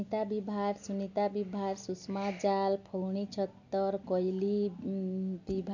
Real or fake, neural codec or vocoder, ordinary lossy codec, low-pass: real; none; none; 7.2 kHz